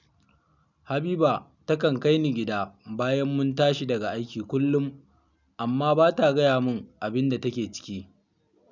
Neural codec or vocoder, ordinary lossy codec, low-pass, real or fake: none; none; 7.2 kHz; real